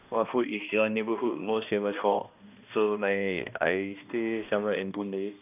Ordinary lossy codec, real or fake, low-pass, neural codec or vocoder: none; fake; 3.6 kHz; codec, 16 kHz, 1 kbps, X-Codec, HuBERT features, trained on balanced general audio